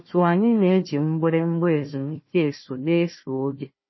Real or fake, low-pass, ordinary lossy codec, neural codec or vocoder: fake; 7.2 kHz; MP3, 24 kbps; codec, 16 kHz, 1 kbps, FunCodec, trained on Chinese and English, 50 frames a second